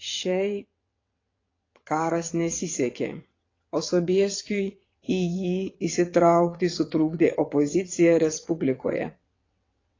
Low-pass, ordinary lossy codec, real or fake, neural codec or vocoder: 7.2 kHz; AAC, 32 kbps; fake; vocoder, 22.05 kHz, 80 mel bands, WaveNeXt